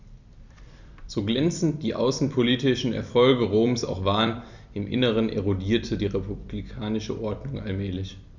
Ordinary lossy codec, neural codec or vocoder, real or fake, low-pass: none; vocoder, 44.1 kHz, 128 mel bands every 256 samples, BigVGAN v2; fake; 7.2 kHz